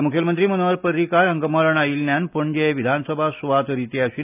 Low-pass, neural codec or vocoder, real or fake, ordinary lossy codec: 3.6 kHz; none; real; none